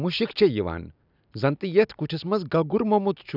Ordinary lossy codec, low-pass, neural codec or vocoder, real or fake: none; 5.4 kHz; none; real